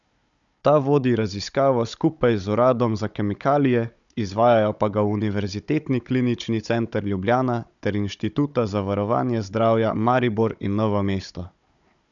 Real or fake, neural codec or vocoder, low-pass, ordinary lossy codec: fake; codec, 16 kHz, 16 kbps, FunCodec, trained on Chinese and English, 50 frames a second; 7.2 kHz; none